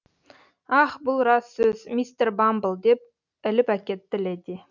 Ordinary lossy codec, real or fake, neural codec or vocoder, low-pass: none; real; none; 7.2 kHz